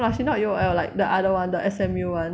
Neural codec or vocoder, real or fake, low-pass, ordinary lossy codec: none; real; none; none